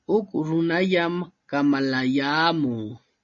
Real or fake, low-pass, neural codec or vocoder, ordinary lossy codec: real; 7.2 kHz; none; MP3, 32 kbps